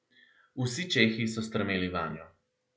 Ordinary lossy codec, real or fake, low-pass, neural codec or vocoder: none; real; none; none